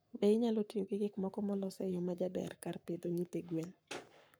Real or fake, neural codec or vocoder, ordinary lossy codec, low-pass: fake; codec, 44.1 kHz, 7.8 kbps, Pupu-Codec; none; none